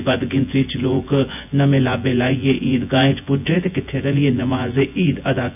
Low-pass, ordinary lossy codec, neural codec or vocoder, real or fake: 3.6 kHz; none; vocoder, 24 kHz, 100 mel bands, Vocos; fake